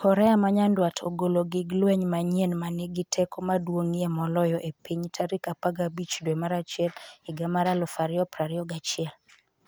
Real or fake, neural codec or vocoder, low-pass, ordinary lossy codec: real; none; none; none